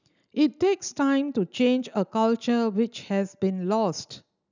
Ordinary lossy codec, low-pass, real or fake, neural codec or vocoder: none; 7.2 kHz; real; none